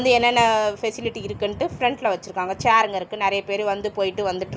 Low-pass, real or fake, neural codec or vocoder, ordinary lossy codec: none; real; none; none